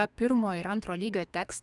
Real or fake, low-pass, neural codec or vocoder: fake; 10.8 kHz; codec, 32 kHz, 1.9 kbps, SNAC